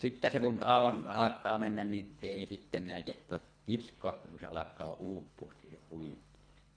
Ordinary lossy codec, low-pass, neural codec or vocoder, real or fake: none; 9.9 kHz; codec, 24 kHz, 1.5 kbps, HILCodec; fake